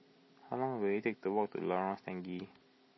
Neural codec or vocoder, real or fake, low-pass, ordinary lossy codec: none; real; 7.2 kHz; MP3, 24 kbps